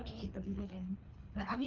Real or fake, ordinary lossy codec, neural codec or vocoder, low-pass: fake; Opus, 32 kbps; codec, 24 kHz, 3 kbps, HILCodec; 7.2 kHz